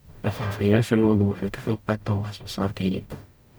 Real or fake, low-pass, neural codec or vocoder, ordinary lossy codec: fake; none; codec, 44.1 kHz, 0.9 kbps, DAC; none